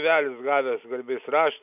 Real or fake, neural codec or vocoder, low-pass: real; none; 3.6 kHz